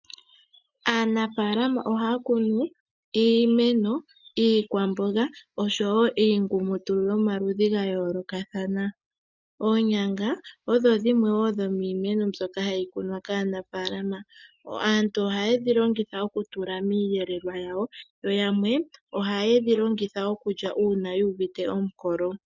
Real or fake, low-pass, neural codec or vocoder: real; 7.2 kHz; none